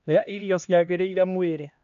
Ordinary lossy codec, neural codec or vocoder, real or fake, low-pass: none; codec, 16 kHz, 1 kbps, X-Codec, HuBERT features, trained on LibriSpeech; fake; 7.2 kHz